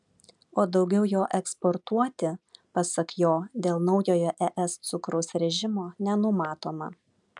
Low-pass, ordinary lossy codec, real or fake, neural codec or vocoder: 10.8 kHz; MP3, 96 kbps; real; none